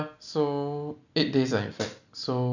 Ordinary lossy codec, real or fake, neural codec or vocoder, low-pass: none; real; none; 7.2 kHz